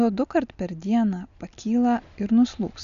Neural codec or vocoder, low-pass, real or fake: none; 7.2 kHz; real